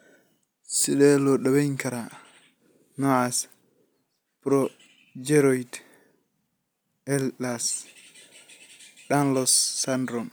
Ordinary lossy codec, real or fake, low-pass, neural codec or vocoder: none; real; none; none